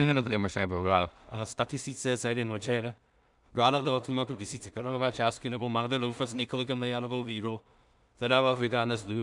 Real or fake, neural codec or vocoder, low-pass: fake; codec, 16 kHz in and 24 kHz out, 0.4 kbps, LongCat-Audio-Codec, two codebook decoder; 10.8 kHz